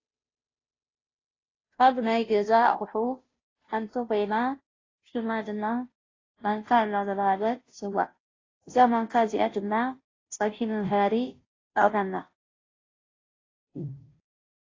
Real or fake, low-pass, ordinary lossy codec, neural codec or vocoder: fake; 7.2 kHz; AAC, 32 kbps; codec, 16 kHz, 0.5 kbps, FunCodec, trained on Chinese and English, 25 frames a second